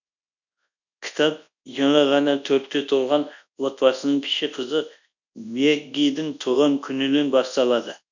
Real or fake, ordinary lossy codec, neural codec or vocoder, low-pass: fake; none; codec, 24 kHz, 0.9 kbps, WavTokenizer, large speech release; 7.2 kHz